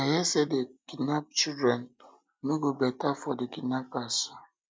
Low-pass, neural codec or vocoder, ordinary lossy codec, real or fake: none; none; none; real